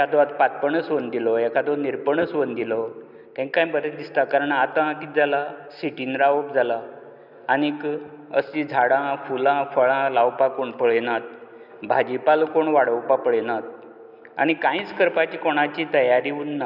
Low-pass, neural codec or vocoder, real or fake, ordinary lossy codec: 5.4 kHz; none; real; none